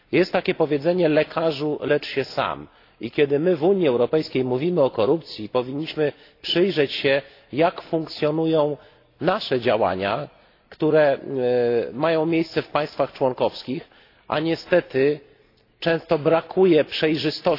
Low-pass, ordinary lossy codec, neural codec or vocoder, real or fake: 5.4 kHz; AAC, 32 kbps; none; real